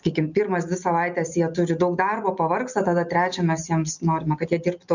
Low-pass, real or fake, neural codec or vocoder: 7.2 kHz; real; none